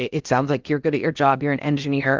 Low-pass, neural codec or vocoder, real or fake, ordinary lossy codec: 7.2 kHz; codec, 16 kHz in and 24 kHz out, 0.8 kbps, FocalCodec, streaming, 65536 codes; fake; Opus, 32 kbps